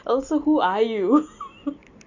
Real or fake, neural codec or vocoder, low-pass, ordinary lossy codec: real; none; 7.2 kHz; none